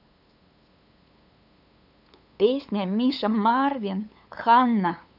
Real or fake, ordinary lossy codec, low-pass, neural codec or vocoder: fake; none; 5.4 kHz; codec, 16 kHz, 8 kbps, FunCodec, trained on LibriTTS, 25 frames a second